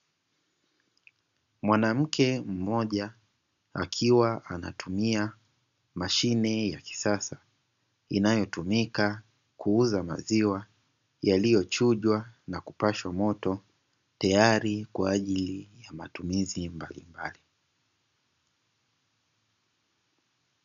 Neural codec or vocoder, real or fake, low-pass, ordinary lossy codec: none; real; 7.2 kHz; AAC, 96 kbps